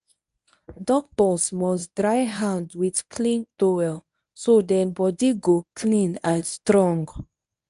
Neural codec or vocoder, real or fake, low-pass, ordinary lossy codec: codec, 24 kHz, 0.9 kbps, WavTokenizer, medium speech release version 1; fake; 10.8 kHz; none